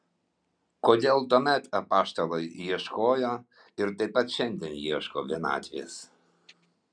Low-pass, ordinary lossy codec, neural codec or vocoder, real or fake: 9.9 kHz; MP3, 96 kbps; none; real